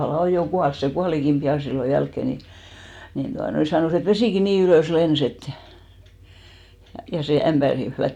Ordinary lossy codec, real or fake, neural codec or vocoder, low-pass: none; real; none; 19.8 kHz